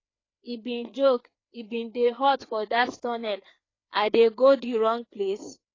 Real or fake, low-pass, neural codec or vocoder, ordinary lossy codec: fake; 7.2 kHz; codec, 16 kHz, 4 kbps, FreqCodec, larger model; AAC, 32 kbps